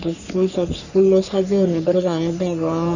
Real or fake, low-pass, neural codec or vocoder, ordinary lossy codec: fake; 7.2 kHz; codec, 44.1 kHz, 3.4 kbps, Pupu-Codec; none